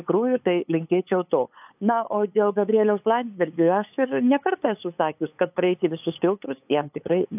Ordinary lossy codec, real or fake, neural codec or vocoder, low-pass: AAC, 32 kbps; fake; codec, 16 kHz, 8 kbps, FunCodec, trained on LibriTTS, 25 frames a second; 3.6 kHz